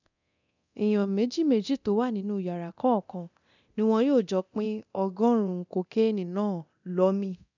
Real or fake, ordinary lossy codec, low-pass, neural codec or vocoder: fake; none; 7.2 kHz; codec, 24 kHz, 0.9 kbps, DualCodec